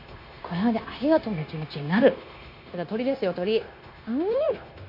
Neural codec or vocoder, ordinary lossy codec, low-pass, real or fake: codec, 16 kHz, 0.9 kbps, LongCat-Audio-Codec; none; 5.4 kHz; fake